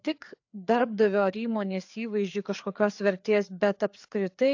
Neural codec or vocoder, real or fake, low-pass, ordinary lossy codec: codec, 16 kHz, 4 kbps, FreqCodec, larger model; fake; 7.2 kHz; AAC, 48 kbps